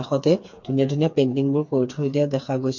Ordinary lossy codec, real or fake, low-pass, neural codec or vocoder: MP3, 48 kbps; fake; 7.2 kHz; codec, 16 kHz, 4 kbps, FreqCodec, smaller model